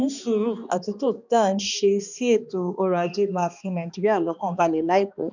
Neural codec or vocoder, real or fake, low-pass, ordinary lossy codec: codec, 16 kHz, 2 kbps, X-Codec, HuBERT features, trained on balanced general audio; fake; 7.2 kHz; none